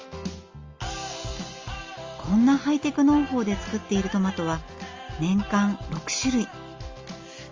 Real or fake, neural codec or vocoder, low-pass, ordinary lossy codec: real; none; 7.2 kHz; Opus, 32 kbps